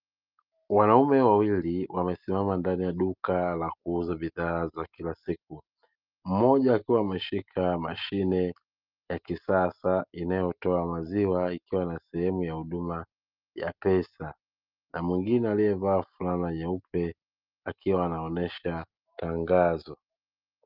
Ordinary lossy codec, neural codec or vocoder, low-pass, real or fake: Opus, 24 kbps; none; 5.4 kHz; real